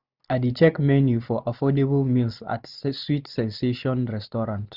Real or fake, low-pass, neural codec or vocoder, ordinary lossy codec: real; 5.4 kHz; none; Opus, 64 kbps